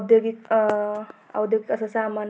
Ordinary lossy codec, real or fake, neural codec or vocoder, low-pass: none; real; none; none